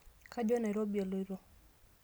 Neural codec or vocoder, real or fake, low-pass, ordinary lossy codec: none; real; none; none